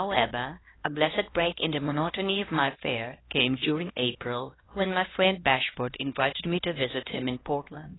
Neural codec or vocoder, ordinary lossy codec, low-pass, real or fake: codec, 16 kHz, 1 kbps, X-Codec, HuBERT features, trained on LibriSpeech; AAC, 16 kbps; 7.2 kHz; fake